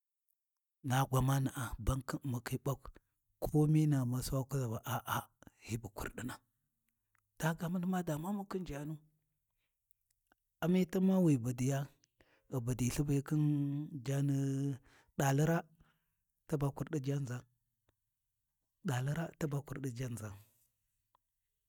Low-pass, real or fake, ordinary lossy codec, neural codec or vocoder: 19.8 kHz; real; none; none